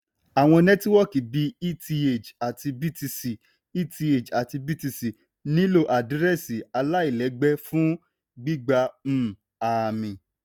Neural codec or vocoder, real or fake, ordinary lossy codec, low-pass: none; real; none; none